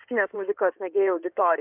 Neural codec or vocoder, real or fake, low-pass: codec, 16 kHz in and 24 kHz out, 2.2 kbps, FireRedTTS-2 codec; fake; 3.6 kHz